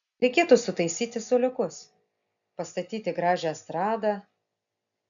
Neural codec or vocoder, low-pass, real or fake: none; 7.2 kHz; real